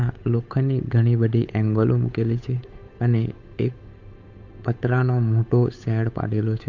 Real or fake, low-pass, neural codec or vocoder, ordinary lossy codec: fake; 7.2 kHz; codec, 16 kHz, 8 kbps, FunCodec, trained on Chinese and English, 25 frames a second; MP3, 64 kbps